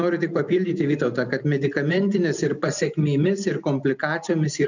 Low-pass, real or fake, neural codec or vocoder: 7.2 kHz; real; none